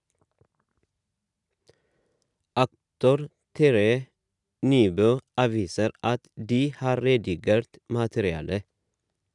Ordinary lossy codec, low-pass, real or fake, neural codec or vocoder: none; 10.8 kHz; real; none